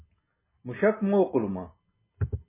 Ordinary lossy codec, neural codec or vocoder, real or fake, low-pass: MP3, 16 kbps; none; real; 3.6 kHz